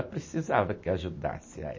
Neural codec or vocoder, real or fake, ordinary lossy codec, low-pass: none; real; MP3, 32 kbps; 7.2 kHz